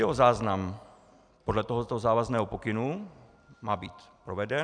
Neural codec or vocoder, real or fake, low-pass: none; real; 9.9 kHz